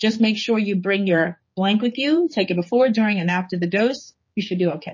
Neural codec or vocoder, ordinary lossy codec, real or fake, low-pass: codec, 16 kHz, 4 kbps, X-Codec, HuBERT features, trained on general audio; MP3, 32 kbps; fake; 7.2 kHz